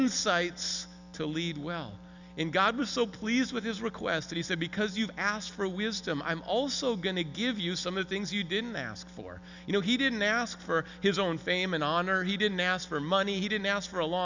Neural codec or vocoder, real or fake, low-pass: none; real; 7.2 kHz